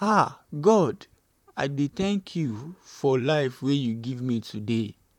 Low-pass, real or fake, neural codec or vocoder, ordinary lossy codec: 19.8 kHz; fake; vocoder, 44.1 kHz, 128 mel bands, Pupu-Vocoder; none